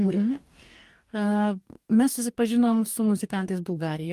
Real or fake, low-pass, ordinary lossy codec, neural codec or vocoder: fake; 14.4 kHz; Opus, 32 kbps; codec, 44.1 kHz, 2.6 kbps, DAC